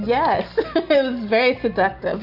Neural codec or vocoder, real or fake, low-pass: none; real; 5.4 kHz